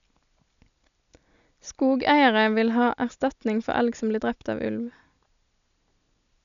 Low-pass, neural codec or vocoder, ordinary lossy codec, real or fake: 7.2 kHz; none; none; real